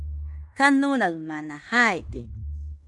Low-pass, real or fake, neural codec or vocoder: 10.8 kHz; fake; codec, 16 kHz in and 24 kHz out, 0.9 kbps, LongCat-Audio-Codec, fine tuned four codebook decoder